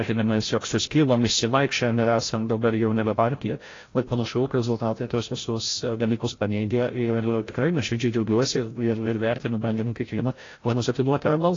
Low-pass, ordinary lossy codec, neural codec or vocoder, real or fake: 7.2 kHz; AAC, 32 kbps; codec, 16 kHz, 0.5 kbps, FreqCodec, larger model; fake